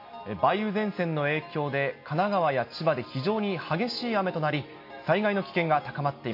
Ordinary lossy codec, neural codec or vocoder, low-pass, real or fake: MP3, 32 kbps; none; 5.4 kHz; real